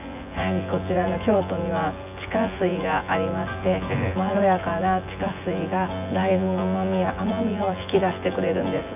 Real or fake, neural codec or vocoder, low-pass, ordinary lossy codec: fake; vocoder, 24 kHz, 100 mel bands, Vocos; 3.6 kHz; none